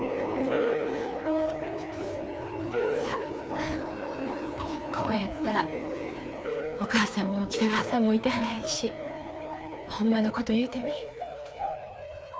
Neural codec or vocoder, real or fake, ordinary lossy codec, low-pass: codec, 16 kHz, 2 kbps, FreqCodec, larger model; fake; none; none